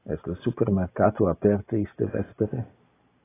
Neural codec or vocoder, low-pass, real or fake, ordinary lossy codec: none; 3.6 kHz; real; AAC, 16 kbps